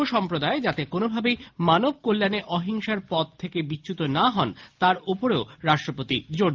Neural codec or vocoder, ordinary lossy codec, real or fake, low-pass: none; Opus, 32 kbps; real; 7.2 kHz